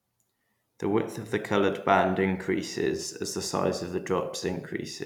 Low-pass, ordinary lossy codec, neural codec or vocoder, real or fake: 19.8 kHz; none; vocoder, 44.1 kHz, 128 mel bands every 256 samples, BigVGAN v2; fake